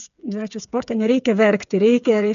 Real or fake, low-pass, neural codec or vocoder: fake; 7.2 kHz; codec, 16 kHz, 8 kbps, FreqCodec, smaller model